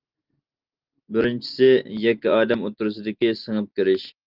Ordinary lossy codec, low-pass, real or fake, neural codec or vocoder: Opus, 32 kbps; 5.4 kHz; real; none